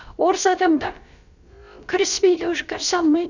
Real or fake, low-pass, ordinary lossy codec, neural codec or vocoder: fake; 7.2 kHz; none; codec, 16 kHz, about 1 kbps, DyCAST, with the encoder's durations